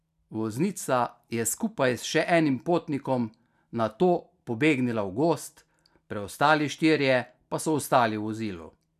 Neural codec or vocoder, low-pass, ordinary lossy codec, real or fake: none; 14.4 kHz; none; real